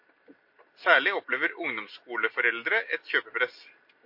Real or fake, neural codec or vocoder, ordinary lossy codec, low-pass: real; none; MP3, 32 kbps; 5.4 kHz